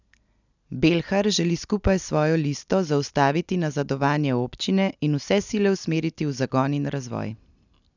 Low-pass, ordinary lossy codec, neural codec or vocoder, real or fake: 7.2 kHz; none; none; real